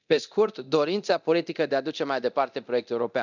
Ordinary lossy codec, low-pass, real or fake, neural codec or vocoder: none; 7.2 kHz; fake; codec, 24 kHz, 0.9 kbps, DualCodec